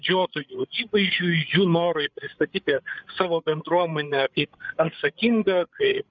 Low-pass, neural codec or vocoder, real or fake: 7.2 kHz; codec, 16 kHz, 8 kbps, FreqCodec, larger model; fake